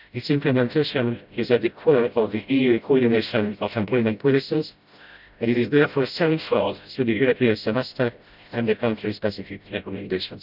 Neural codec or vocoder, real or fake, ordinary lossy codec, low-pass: codec, 16 kHz, 0.5 kbps, FreqCodec, smaller model; fake; none; 5.4 kHz